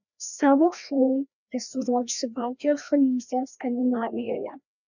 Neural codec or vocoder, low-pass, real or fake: codec, 16 kHz, 1 kbps, FreqCodec, larger model; 7.2 kHz; fake